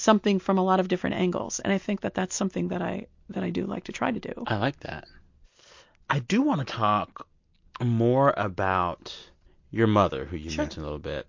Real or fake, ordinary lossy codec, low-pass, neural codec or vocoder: real; MP3, 48 kbps; 7.2 kHz; none